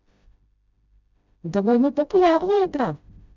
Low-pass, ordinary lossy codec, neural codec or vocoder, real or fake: 7.2 kHz; none; codec, 16 kHz, 0.5 kbps, FreqCodec, smaller model; fake